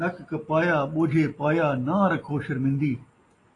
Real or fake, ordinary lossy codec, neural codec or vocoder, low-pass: real; AAC, 32 kbps; none; 10.8 kHz